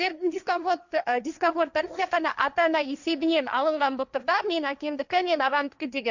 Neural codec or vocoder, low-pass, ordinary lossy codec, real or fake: codec, 16 kHz, 1.1 kbps, Voila-Tokenizer; 7.2 kHz; none; fake